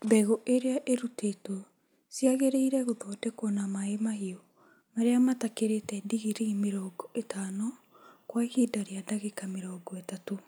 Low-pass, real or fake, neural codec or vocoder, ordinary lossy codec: none; real; none; none